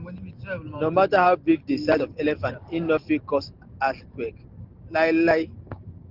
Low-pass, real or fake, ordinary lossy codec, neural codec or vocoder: 5.4 kHz; real; Opus, 16 kbps; none